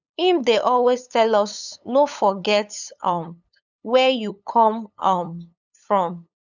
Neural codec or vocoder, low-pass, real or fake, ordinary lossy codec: codec, 16 kHz, 8 kbps, FunCodec, trained on LibriTTS, 25 frames a second; 7.2 kHz; fake; none